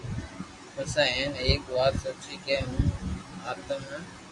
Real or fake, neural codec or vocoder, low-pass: real; none; 10.8 kHz